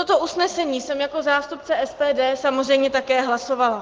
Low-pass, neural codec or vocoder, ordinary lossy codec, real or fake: 7.2 kHz; none; Opus, 16 kbps; real